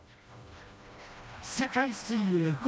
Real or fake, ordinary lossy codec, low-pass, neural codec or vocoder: fake; none; none; codec, 16 kHz, 1 kbps, FreqCodec, smaller model